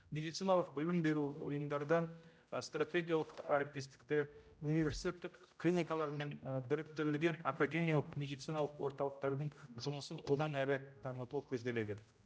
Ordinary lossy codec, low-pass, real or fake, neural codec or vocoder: none; none; fake; codec, 16 kHz, 0.5 kbps, X-Codec, HuBERT features, trained on general audio